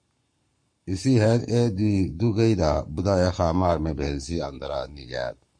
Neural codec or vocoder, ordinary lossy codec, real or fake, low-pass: codec, 44.1 kHz, 7.8 kbps, Pupu-Codec; MP3, 48 kbps; fake; 9.9 kHz